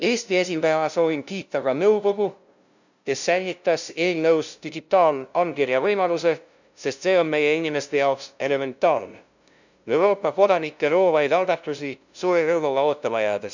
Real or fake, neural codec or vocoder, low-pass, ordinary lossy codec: fake; codec, 16 kHz, 0.5 kbps, FunCodec, trained on LibriTTS, 25 frames a second; 7.2 kHz; none